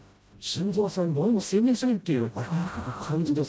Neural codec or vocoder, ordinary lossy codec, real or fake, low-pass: codec, 16 kHz, 0.5 kbps, FreqCodec, smaller model; none; fake; none